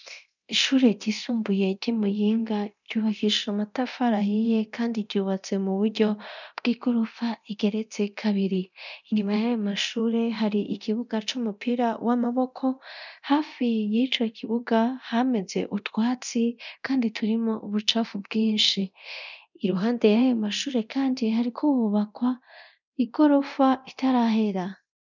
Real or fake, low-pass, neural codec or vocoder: fake; 7.2 kHz; codec, 24 kHz, 0.9 kbps, DualCodec